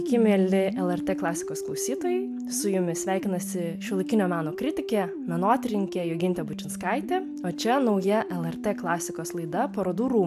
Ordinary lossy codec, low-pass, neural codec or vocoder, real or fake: AAC, 96 kbps; 14.4 kHz; none; real